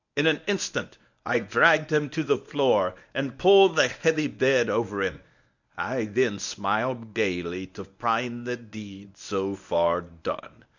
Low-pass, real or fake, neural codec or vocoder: 7.2 kHz; fake; codec, 24 kHz, 0.9 kbps, WavTokenizer, medium speech release version 1